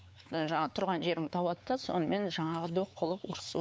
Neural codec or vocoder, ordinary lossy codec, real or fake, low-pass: codec, 16 kHz, 4 kbps, X-Codec, WavLM features, trained on Multilingual LibriSpeech; none; fake; none